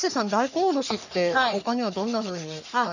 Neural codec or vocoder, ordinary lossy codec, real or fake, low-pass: codec, 44.1 kHz, 3.4 kbps, Pupu-Codec; none; fake; 7.2 kHz